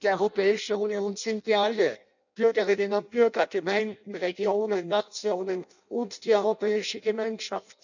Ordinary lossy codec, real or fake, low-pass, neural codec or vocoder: none; fake; 7.2 kHz; codec, 16 kHz in and 24 kHz out, 0.6 kbps, FireRedTTS-2 codec